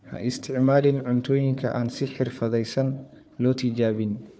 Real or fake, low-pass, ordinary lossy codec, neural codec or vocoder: fake; none; none; codec, 16 kHz, 4 kbps, FunCodec, trained on Chinese and English, 50 frames a second